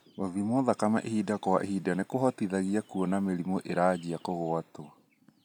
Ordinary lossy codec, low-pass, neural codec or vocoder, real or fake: none; 19.8 kHz; none; real